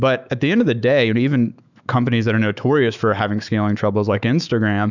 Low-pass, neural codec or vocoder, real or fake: 7.2 kHz; autoencoder, 48 kHz, 128 numbers a frame, DAC-VAE, trained on Japanese speech; fake